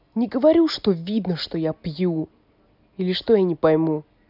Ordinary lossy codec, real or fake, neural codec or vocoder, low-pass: none; real; none; 5.4 kHz